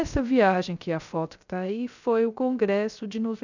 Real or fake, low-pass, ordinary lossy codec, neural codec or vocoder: fake; 7.2 kHz; none; codec, 16 kHz, 0.3 kbps, FocalCodec